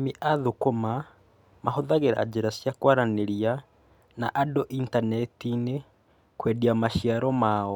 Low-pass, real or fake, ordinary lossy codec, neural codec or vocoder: 19.8 kHz; real; none; none